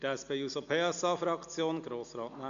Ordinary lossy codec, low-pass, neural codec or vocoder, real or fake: none; 7.2 kHz; none; real